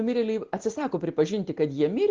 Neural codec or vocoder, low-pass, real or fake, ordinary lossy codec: none; 7.2 kHz; real; Opus, 16 kbps